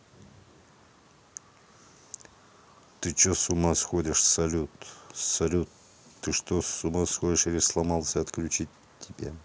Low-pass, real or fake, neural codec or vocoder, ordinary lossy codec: none; real; none; none